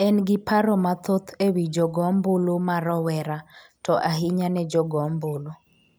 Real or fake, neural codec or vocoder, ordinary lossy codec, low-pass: real; none; none; none